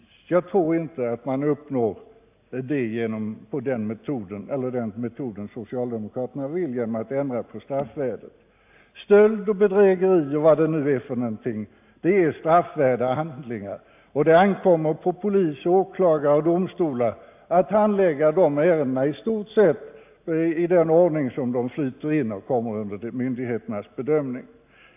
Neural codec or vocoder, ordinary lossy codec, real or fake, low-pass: none; AAC, 32 kbps; real; 3.6 kHz